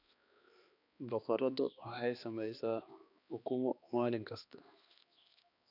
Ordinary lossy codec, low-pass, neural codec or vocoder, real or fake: none; 5.4 kHz; codec, 16 kHz, 2 kbps, X-Codec, HuBERT features, trained on balanced general audio; fake